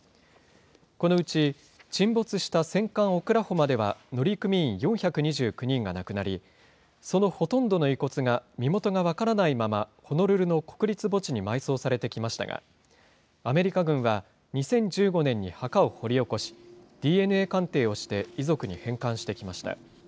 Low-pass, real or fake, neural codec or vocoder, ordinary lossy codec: none; real; none; none